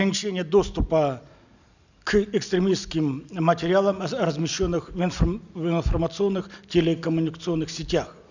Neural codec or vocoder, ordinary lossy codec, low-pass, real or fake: none; none; 7.2 kHz; real